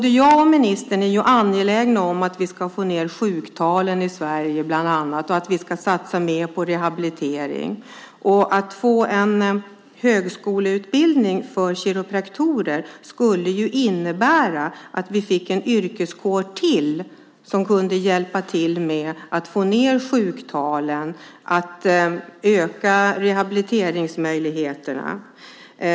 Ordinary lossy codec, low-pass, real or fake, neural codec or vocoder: none; none; real; none